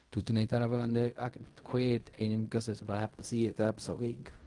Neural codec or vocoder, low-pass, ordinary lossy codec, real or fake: codec, 16 kHz in and 24 kHz out, 0.4 kbps, LongCat-Audio-Codec, fine tuned four codebook decoder; 10.8 kHz; Opus, 32 kbps; fake